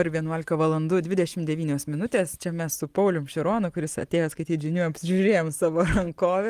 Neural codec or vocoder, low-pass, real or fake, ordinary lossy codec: none; 14.4 kHz; real; Opus, 24 kbps